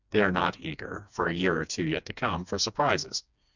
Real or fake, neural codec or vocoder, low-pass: fake; codec, 16 kHz, 2 kbps, FreqCodec, smaller model; 7.2 kHz